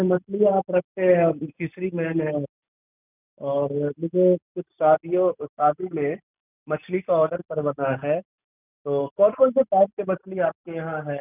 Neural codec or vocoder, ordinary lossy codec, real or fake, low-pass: none; none; real; 3.6 kHz